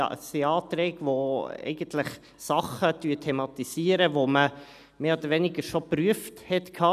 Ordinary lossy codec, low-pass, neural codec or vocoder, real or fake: none; 14.4 kHz; none; real